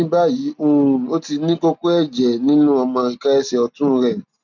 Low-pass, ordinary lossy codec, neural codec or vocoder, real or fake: 7.2 kHz; none; none; real